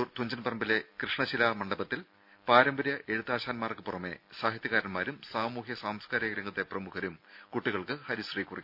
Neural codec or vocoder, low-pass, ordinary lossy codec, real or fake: none; 5.4 kHz; none; real